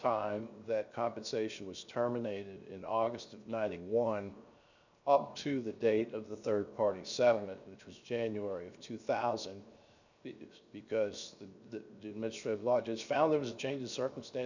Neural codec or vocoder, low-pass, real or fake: codec, 16 kHz, 0.7 kbps, FocalCodec; 7.2 kHz; fake